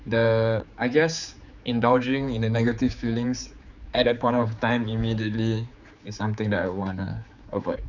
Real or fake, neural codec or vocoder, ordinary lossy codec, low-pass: fake; codec, 16 kHz, 4 kbps, X-Codec, HuBERT features, trained on general audio; none; 7.2 kHz